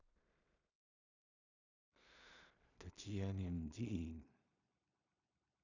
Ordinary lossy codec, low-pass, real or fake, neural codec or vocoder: none; 7.2 kHz; fake; codec, 16 kHz in and 24 kHz out, 0.4 kbps, LongCat-Audio-Codec, two codebook decoder